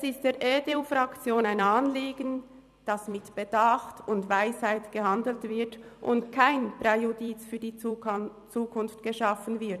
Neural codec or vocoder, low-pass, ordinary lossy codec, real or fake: vocoder, 44.1 kHz, 128 mel bands every 256 samples, BigVGAN v2; 14.4 kHz; none; fake